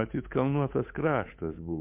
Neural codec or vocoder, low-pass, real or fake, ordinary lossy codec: codec, 16 kHz, 8 kbps, FunCodec, trained on Chinese and English, 25 frames a second; 3.6 kHz; fake; MP3, 32 kbps